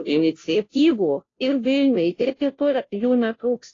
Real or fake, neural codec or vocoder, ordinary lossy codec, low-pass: fake; codec, 16 kHz, 0.5 kbps, FunCodec, trained on Chinese and English, 25 frames a second; AAC, 32 kbps; 7.2 kHz